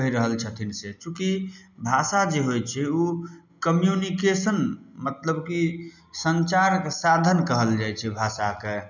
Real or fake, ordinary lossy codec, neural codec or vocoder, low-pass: real; none; none; 7.2 kHz